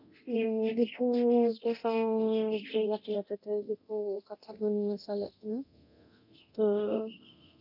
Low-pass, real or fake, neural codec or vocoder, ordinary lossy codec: 5.4 kHz; fake; codec, 24 kHz, 0.9 kbps, DualCodec; none